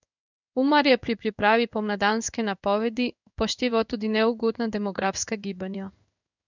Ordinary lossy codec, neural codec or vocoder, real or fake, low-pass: none; codec, 16 kHz in and 24 kHz out, 1 kbps, XY-Tokenizer; fake; 7.2 kHz